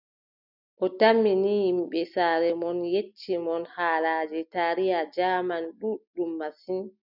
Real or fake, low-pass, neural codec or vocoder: real; 5.4 kHz; none